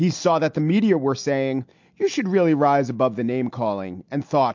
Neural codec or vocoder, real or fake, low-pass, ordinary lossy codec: none; real; 7.2 kHz; MP3, 48 kbps